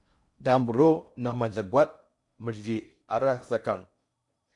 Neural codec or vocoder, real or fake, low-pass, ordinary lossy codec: codec, 16 kHz in and 24 kHz out, 0.6 kbps, FocalCodec, streaming, 4096 codes; fake; 10.8 kHz; AAC, 64 kbps